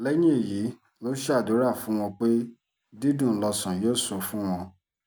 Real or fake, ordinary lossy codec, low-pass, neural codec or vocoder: real; none; none; none